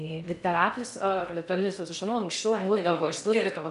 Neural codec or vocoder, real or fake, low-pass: codec, 16 kHz in and 24 kHz out, 0.6 kbps, FocalCodec, streaming, 4096 codes; fake; 10.8 kHz